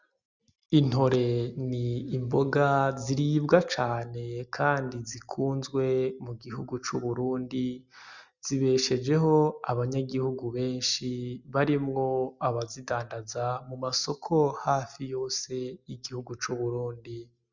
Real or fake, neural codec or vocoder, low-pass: real; none; 7.2 kHz